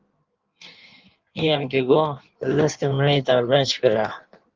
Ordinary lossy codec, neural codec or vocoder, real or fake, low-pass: Opus, 24 kbps; codec, 16 kHz in and 24 kHz out, 1.1 kbps, FireRedTTS-2 codec; fake; 7.2 kHz